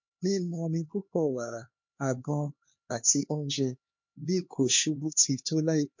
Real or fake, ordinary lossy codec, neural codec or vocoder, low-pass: fake; MP3, 48 kbps; codec, 16 kHz, 2 kbps, X-Codec, HuBERT features, trained on LibriSpeech; 7.2 kHz